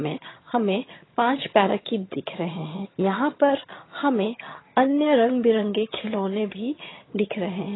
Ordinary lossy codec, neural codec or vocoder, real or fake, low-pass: AAC, 16 kbps; vocoder, 22.05 kHz, 80 mel bands, HiFi-GAN; fake; 7.2 kHz